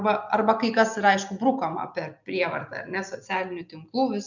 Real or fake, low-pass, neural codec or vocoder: real; 7.2 kHz; none